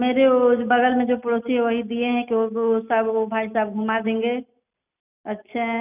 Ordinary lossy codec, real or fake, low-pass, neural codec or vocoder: none; real; 3.6 kHz; none